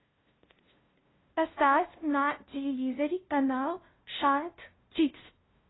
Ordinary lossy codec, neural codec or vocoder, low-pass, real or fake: AAC, 16 kbps; codec, 16 kHz, 0.5 kbps, FunCodec, trained on LibriTTS, 25 frames a second; 7.2 kHz; fake